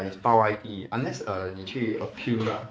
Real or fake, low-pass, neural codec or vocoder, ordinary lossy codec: fake; none; codec, 16 kHz, 4 kbps, X-Codec, HuBERT features, trained on balanced general audio; none